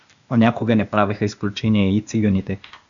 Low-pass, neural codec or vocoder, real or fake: 7.2 kHz; codec, 16 kHz, 0.8 kbps, ZipCodec; fake